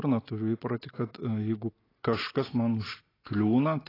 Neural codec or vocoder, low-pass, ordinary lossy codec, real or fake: none; 5.4 kHz; AAC, 24 kbps; real